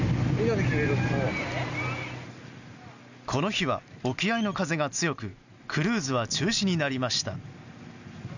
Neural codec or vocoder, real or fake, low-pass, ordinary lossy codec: none; real; 7.2 kHz; none